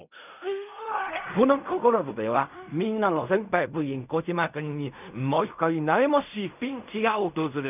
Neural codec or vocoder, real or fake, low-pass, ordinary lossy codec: codec, 16 kHz in and 24 kHz out, 0.4 kbps, LongCat-Audio-Codec, fine tuned four codebook decoder; fake; 3.6 kHz; none